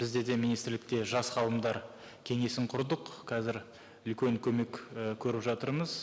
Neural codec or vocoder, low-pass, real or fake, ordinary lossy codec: none; none; real; none